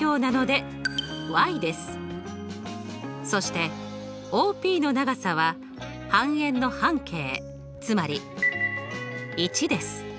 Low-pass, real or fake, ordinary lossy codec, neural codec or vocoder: none; real; none; none